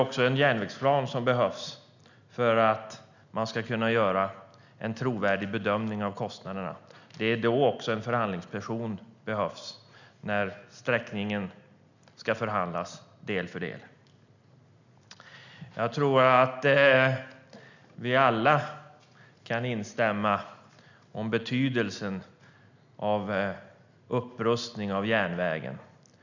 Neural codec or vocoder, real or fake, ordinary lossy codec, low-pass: none; real; none; 7.2 kHz